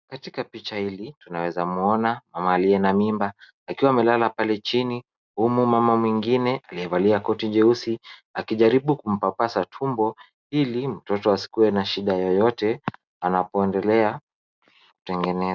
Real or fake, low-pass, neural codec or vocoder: real; 7.2 kHz; none